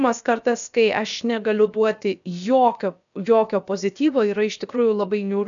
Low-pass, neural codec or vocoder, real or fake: 7.2 kHz; codec, 16 kHz, about 1 kbps, DyCAST, with the encoder's durations; fake